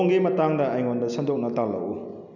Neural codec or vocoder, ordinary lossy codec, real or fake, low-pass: none; none; real; 7.2 kHz